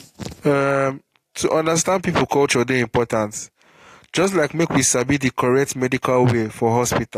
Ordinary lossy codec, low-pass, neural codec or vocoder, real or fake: AAC, 48 kbps; 14.4 kHz; none; real